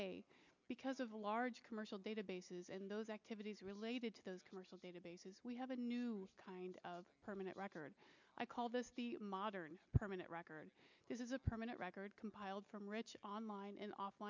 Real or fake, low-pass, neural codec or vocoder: real; 7.2 kHz; none